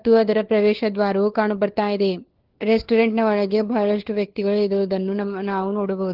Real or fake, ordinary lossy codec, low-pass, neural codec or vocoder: fake; Opus, 16 kbps; 5.4 kHz; codec, 16 kHz, 4 kbps, FunCodec, trained on LibriTTS, 50 frames a second